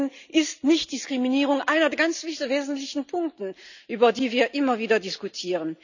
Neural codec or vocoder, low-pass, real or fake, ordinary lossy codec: none; 7.2 kHz; real; none